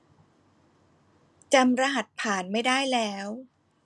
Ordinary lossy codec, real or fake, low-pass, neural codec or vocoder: none; real; none; none